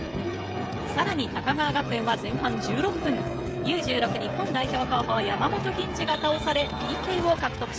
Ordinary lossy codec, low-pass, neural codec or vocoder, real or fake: none; none; codec, 16 kHz, 16 kbps, FreqCodec, smaller model; fake